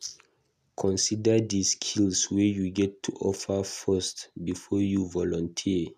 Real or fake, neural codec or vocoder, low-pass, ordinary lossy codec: real; none; 14.4 kHz; none